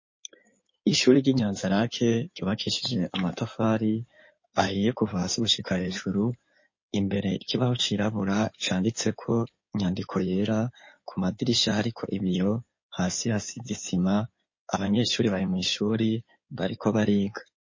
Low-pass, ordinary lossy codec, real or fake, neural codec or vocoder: 7.2 kHz; MP3, 32 kbps; fake; codec, 16 kHz in and 24 kHz out, 2.2 kbps, FireRedTTS-2 codec